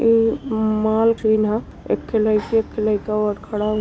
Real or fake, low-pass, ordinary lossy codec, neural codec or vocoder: real; none; none; none